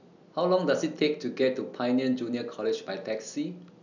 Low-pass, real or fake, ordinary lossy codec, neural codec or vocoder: 7.2 kHz; real; none; none